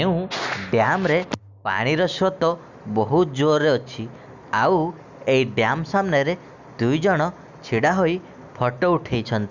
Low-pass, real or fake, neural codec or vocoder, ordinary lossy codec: 7.2 kHz; real; none; none